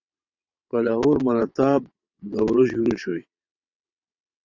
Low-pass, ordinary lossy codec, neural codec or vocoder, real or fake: 7.2 kHz; Opus, 64 kbps; vocoder, 22.05 kHz, 80 mel bands, WaveNeXt; fake